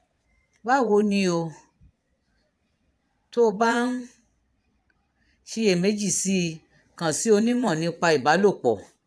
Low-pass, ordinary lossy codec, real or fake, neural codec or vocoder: none; none; fake; vocoder, 22.05 kHz, 80 mel bands, Vocos